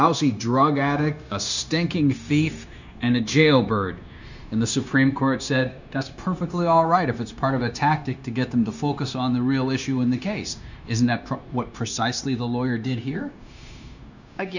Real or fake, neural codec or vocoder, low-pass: fake; codec, 16 kHz, 0.9 kbps, LongCat-Audio-Codec; 7.2 kHz